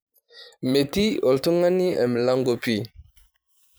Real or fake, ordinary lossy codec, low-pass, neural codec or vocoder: fake; none; none; vocoder, 44.1 kHz, 128 mel bands every 512 samples, BigVGAN v2